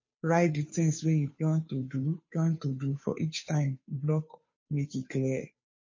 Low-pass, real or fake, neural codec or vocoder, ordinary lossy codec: 7.2 kHz; fake; codec, 16 kHz, 2 kbps, FunCodec, trained on Chinese and English, 25 frames a second; MP3, 32 kbps